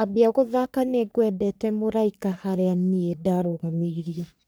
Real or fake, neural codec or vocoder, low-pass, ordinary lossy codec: fake; codec, 44.1 kHz, 3.4 kbps, Pupu-Codec; none; none